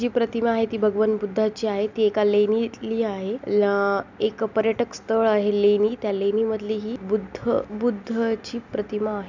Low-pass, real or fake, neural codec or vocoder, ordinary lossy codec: 7.2 kHz; real; none; none